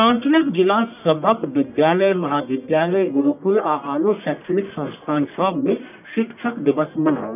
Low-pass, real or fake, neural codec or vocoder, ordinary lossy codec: 3.6 kHz; fake; codec, 44.1 kHz, 1.7 kbps, Pupu-Codec; none